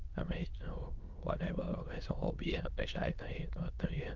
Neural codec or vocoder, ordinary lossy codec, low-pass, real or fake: autoencoder, 22.05 kHz, a latent of 192 numbers a frame, VITS, trained on many speakers; Opus, 24 kbps; 7.2 kHz; fake